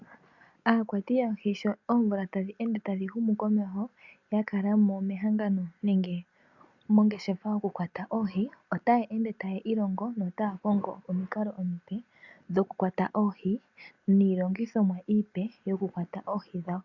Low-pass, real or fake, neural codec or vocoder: 7.2 kHz; real; none